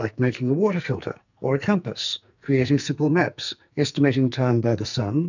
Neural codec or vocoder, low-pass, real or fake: codec, 44.1 kHz, 2.6 kbps, SNAC; 7.2 kHz; fake